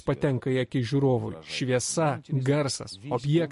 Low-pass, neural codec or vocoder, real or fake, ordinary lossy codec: 14.4 kHz; none; real; MP3, 48 kbps